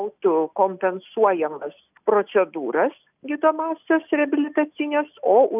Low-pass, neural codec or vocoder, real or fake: 3.6 kHz; none; real